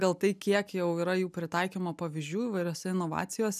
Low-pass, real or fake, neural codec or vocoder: 14.4 kHz; real; none